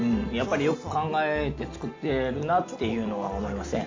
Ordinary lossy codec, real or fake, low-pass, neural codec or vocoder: MP3, 48 kbps; real; 7.2 kHz; none